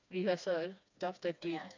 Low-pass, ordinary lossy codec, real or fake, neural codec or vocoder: 7.2 kHz; MP3, 64 kbps; fake; codec, 16 kHz, 2 kbps, FreqCodec, smaller model